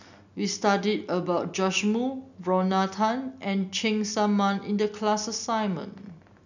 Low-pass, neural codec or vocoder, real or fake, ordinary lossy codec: 7.2 kHz; none; real; none